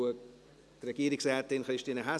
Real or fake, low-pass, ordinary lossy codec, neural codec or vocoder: real; none; none; none